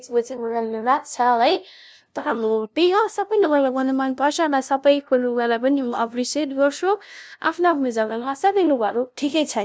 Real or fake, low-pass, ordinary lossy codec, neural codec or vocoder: fake; none; none; codec, 16 kHz, 0.5 kbps, FunCodec, trained on LibriTTS, 25 frames a second